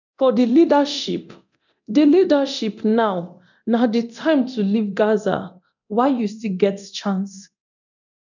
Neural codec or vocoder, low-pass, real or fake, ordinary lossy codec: codec, 24 kHz, 0.9 kbps, DualCodec; 7.2 kHz; fake; none